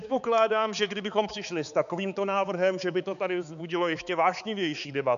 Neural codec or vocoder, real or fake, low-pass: codec, 16 kHz, 4 kbps, X-Codec, HuBERT features, trained on balanced general audio; fake; 7.2 kHz